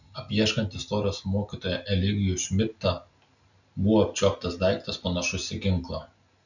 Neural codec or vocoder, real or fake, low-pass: none; real; 7.2 kHz